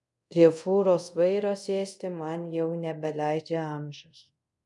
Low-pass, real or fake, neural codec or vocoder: 10.8 kHz; fake; codec, 24 kHz, 0.5 kbps, DualCodec